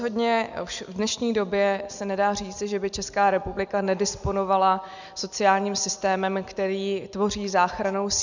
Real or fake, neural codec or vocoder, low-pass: real; none; 7.2 kHz